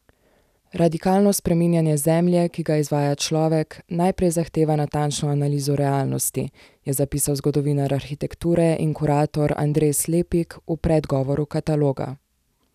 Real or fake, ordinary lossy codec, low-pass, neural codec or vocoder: real; none; 14.4 kHz; none